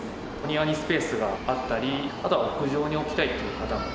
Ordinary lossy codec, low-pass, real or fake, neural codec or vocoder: none; none; real; none